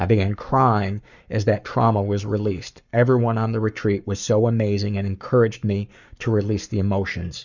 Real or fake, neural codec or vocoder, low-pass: fake; codec, 44.1 kHz, 7.8 kbps, Pupu-Codec; 7.2 kHz